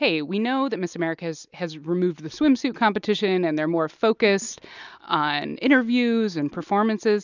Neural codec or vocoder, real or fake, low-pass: none; real; 7.2 kHz